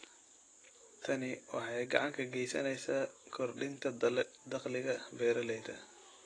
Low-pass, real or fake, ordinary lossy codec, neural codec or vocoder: 9.9 kHz; real; AAC, 32 kbps; none